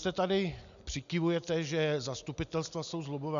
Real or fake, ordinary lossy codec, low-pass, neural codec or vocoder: real; AAC, 96 kbps; 7.2 kHz; none